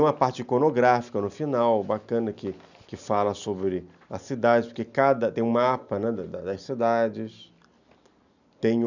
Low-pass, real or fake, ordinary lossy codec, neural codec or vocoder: 7.2 kHz; real; none; none